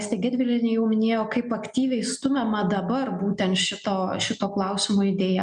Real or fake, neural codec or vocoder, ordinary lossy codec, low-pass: real; none; MP3, 96 kbps; 9.9 kHz